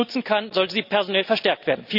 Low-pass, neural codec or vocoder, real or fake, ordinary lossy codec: 5.4 kHz; none; real; none